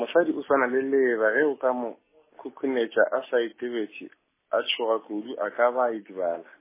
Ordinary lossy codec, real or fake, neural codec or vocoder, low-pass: MP3, 16 kbps; real; none; 3.6 kHz